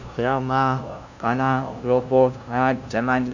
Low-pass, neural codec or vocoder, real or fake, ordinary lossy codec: 7.2 kHz; codec, 16 kHz, 0.5 kbps, FunCodec, trained on LibriTTS, 25 frames a second; fake; none